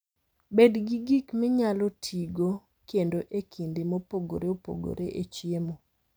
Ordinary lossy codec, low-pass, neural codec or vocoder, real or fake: none; none; none; real